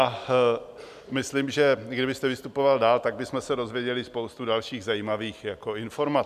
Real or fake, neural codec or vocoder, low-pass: real; none; 14.4 kHz